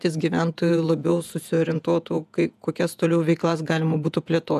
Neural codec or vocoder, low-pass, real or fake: vocoder, 48 kHz, 128 mel bands, Vocos; 14.4 kHz; fake